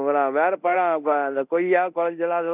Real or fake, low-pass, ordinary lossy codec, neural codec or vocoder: fake; 3.6 kHz; none; codec, 24 kHz, 0.9 kbps, DualCodec